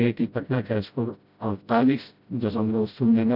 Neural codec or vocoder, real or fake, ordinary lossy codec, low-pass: codec, 16 kHz, 0.5 kbps, FreqCodec, smaller model; fake; none; 5.4 kHz